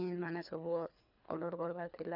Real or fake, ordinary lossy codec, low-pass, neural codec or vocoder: fake; none; 5.4 kHz; codec, 24 kHz, 3 kbps, HILCodec